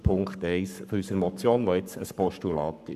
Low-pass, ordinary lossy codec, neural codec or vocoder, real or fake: 14.4 kHz; none; codec, 44.1 kHz, 7.8 kbps, Pupu-Codec; fake